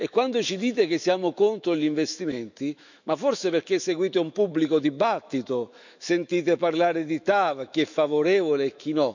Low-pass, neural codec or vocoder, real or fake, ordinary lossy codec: 7.2 kHz; autoencoder, 48 kHz, 128 numbers a frame, DAC-VAE, trained on Japanese speech; fake; none